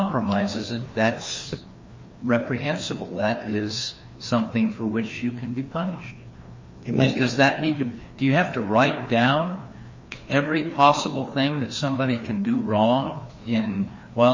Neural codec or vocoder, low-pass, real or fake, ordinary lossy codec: codec, 16 kHz, 2 kbps, FreqCodec, larger model; 7.2 kHz; fake; MP3, 32 kbps